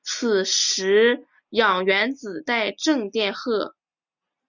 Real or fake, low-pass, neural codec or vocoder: real; 7.2 kHz; none